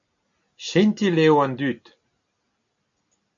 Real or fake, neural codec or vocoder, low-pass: real; none; 7.2 kHz